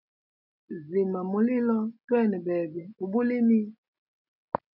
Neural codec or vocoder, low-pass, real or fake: none; 5.4 kHz; real